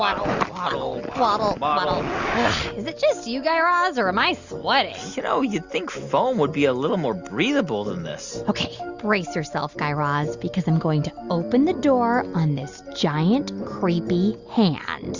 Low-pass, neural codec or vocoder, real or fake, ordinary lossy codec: 7.2 kHz; none; real; Opus, 64 kbps